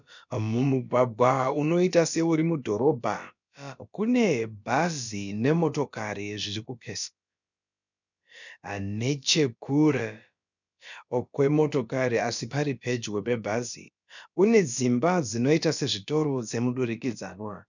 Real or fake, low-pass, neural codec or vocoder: fake; 7.2 kHz; codec, 16 kHz, about 1 kbps, DyCAST, with the encoder's durations